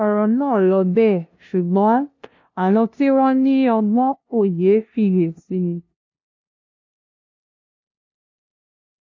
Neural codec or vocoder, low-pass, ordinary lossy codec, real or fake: codec, 16 kHz, 0.5 kbps, FunCodec, trained on LibriTTS, 25 frames a second; 7.2 kHz; AAC, 48 kbps; fake